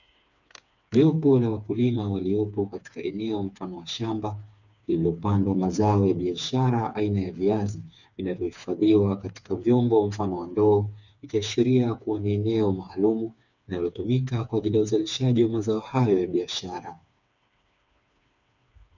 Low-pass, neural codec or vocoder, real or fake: 7.2 kHz; codec, 16 kHz, 4 kbps, FreqCodec, smaller model; fake